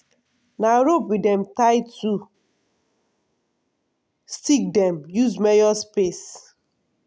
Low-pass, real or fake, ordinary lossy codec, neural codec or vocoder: none; real; none; none